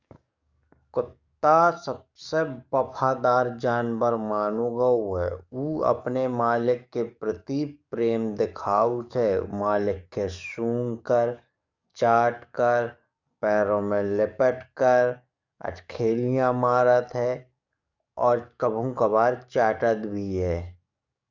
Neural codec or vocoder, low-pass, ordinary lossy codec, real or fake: codec, 44.1 kHz, 7.8 kbps, DAC; 7.2 kHz; Opus, 64 kbps; fake